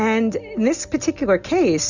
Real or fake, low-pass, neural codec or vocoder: real; 7.2 kHz; none